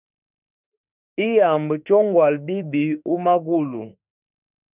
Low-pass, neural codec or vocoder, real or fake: 3.6 kHz; autoencoder, 48 kHz, 32 numbers a frame, DAC-VAE, trained on Japanese speech; fake